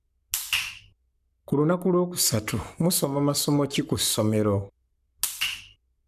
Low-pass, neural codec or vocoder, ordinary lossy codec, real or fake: 14.4 kHz; codec, 44.1 kHz, 7.8 kbps, Pupu-Codec; none; fake